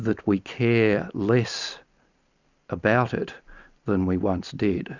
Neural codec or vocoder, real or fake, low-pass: none; real; 7.2 kHz